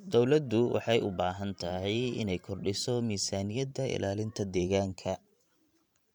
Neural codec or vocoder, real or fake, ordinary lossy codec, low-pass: vocoder, 44.1 kHz, 128 mel bands every 512 samples, BigVGAN v2; fake; none; 19.8 kHz